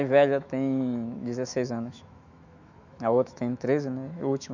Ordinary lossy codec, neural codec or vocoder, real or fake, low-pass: none; autoencoder, 48 kHz, 128 numbers a frame, DAC-VAE, trained on Japanese speech; fake; 7.2 kHz